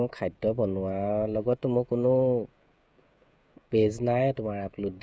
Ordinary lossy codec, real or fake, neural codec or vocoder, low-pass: none; fake; codec, 16 kHz, 16 kbps, FreqCodec, smaller model; none